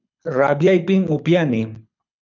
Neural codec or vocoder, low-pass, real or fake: codec, 24 kHz, 6 kbps, HILCodec; 7.2 kHz; fake